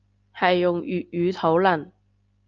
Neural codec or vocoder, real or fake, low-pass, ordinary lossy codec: none; real; 7.2 kHz; Opus, 24 kbps